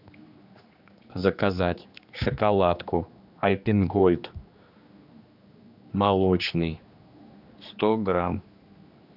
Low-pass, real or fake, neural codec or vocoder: 5.4 kHz; fake; codec, 16 kHz, 2 kbps, X-Codec, HuBERT features, trained on general audio